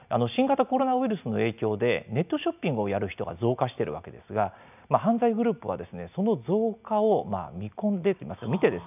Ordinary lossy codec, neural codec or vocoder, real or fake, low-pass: none; none; real; 3.6 kHz